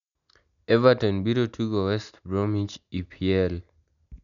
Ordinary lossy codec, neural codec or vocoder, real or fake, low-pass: none; none; real; 7.2 kHz